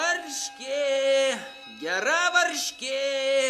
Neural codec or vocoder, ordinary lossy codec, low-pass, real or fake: none; AAC, 96 kbps; 14.4 kHz; real